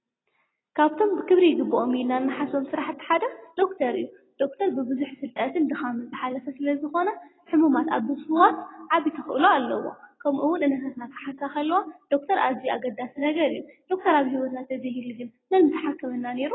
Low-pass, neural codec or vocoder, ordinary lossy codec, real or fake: 7.2 kHz; none; AAC, 16 kbps; real